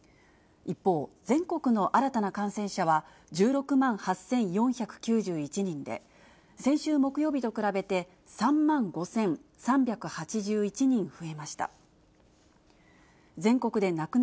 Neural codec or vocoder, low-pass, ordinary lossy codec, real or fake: none; none; none; real